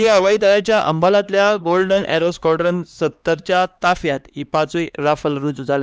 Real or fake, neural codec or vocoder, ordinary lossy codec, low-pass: fake; codec, 16 kHz, 2 kbps, X-Codec, HuBERT features, trained on LibriSpeech; none; none